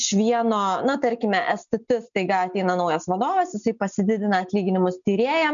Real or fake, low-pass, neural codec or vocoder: real; 7.2 kHz; none